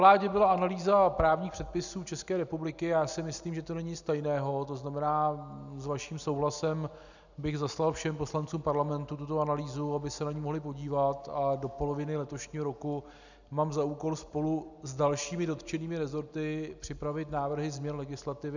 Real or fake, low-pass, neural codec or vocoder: real; 7.2 kHz; none